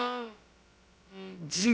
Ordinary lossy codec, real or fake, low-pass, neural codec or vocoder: none; fake; none; codec, 16 kHz, about 1 kbps, DyCAST, with the encoder's durations